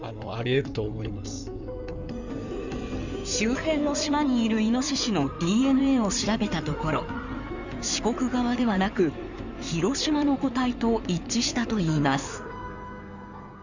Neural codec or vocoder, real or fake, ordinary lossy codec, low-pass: codec, 16 kHz in and 24 kHz out, 2.2 kbps, FireRedTTS-2 codec; fake; none; 7.2 kHz